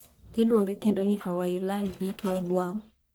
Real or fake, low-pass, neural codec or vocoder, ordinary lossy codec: fake; none; codec, 44.1 kHz, 1.7 kbps, Pupu-Codec; none